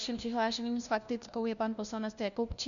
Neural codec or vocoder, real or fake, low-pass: codec, 16 kHz, 1 kbps, FunCodec, trained on LibriTTS, 50 frames a second; fake; 7.2 kHz